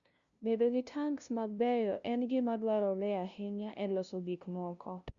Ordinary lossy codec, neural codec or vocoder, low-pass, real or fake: Opus, 64 kbps; codec, 16 kHz, 0.5 kbps, FunCodec, trained on LibriTTS, 25 frames a second; 7.2 kHz; fake